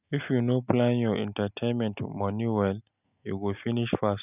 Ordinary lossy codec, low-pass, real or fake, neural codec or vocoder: none; 3.6 kHz; real; none